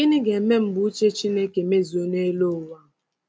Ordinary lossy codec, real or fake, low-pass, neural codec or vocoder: none; real; none; none